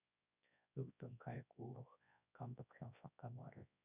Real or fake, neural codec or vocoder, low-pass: fake; codec, 24 kHz, 0.9 kbps, WavTokenizer, large speech release; 3.6 kHz